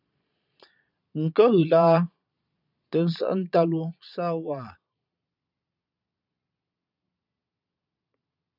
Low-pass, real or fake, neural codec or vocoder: 5.4 kHz; fake; vocoder, 22.05 kHz, 80 mel bands, Vocos